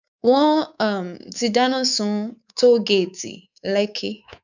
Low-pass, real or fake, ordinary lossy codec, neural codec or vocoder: 7.2 kHz; fake; none; codec, 24 kHz, 3.1 kbps, DualCodec